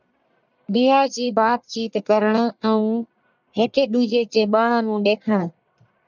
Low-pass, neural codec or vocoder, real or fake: 7.2 kHz; codec, 44.1 kHz, 1.7 kbps, Pupu-Codec; fake